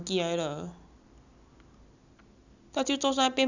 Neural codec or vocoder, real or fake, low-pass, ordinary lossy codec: none; real; 7.2 kHz; none